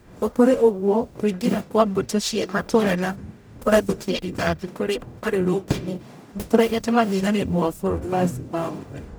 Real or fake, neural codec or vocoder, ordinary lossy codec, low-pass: fake; codec, 44.1 kHz, 0.9 kbps, DAC; none; none